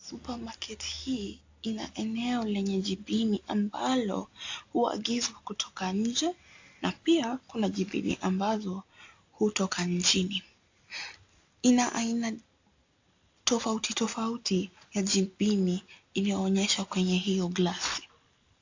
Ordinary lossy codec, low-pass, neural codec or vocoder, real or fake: AAC, 48 kbps; 7.2 kHz; none; real